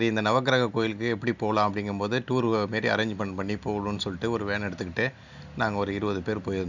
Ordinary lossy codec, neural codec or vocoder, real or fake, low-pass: none; none; real; 7.2 kHz